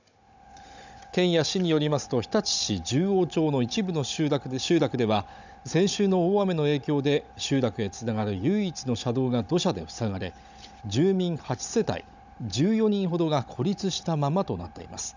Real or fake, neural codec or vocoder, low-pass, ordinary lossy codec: fake; codec, 16 kHz, 16 kbps, FunCodec, trained on Chinese and English, 50 frames a second; 7.2 kHz; none